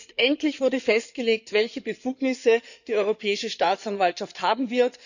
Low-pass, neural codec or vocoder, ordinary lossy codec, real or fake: 7.2 kHz; codec, 16 kHz in and 24 kHz out, 2.2 kbps, FireRedTTS-2 codec; none; fake